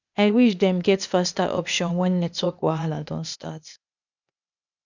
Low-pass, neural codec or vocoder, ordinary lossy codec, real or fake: 7.2 kHz; codec, 16 kHz, 0.8 kbps, ZipCodec; none; fake